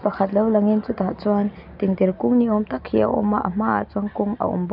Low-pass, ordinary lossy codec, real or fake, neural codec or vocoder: 5.4 kHz; none; real; none